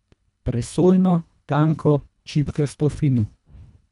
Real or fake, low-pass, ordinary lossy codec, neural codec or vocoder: fake; 10.8 kHz; none; codec, 24 kHz, 1.5 kbps, HILCodec